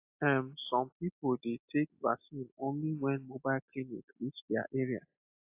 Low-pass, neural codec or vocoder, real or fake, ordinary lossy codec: 3.6 kHz; none; real; AAC, 32 kbps